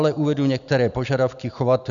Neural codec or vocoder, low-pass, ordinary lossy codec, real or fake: none; 7.2 kHz; MP3, 96 kbps; real